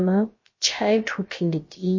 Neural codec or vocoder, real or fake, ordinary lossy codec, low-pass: codec, 16 kHz, about 1 kbps, DyCAST, with the encoder's durations; fake; MP3, 32 kbps; 7.2 kHz